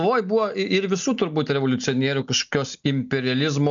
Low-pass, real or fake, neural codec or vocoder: 7.2 kHz; real; none